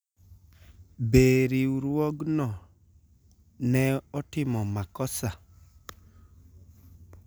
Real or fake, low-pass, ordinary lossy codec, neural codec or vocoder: real; none; none; none